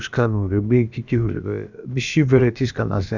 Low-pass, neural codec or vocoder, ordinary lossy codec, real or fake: 7.2 kHz; codec, 16 kHz, about 1 kbps, DyCAST, with the encoder's durations; none; fake